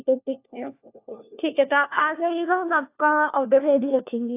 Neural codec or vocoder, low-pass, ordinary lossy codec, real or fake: codec, 16 kHz, 1 kbps, FunCodec, trained on LibriTTS, 50 frames a second; 3.6 kHz; AAC, 24 kbps; fake